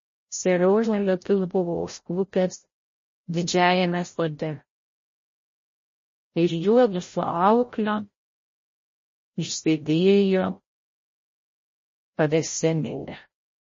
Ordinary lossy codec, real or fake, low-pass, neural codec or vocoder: MP3, 32 kbps; fake; 7.2 kHz; codec, 16 kHz, 0.5 kbps, FreqCodec, larger model